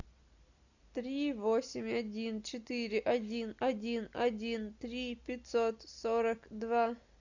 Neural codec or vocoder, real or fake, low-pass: none; real; 7.2 kHz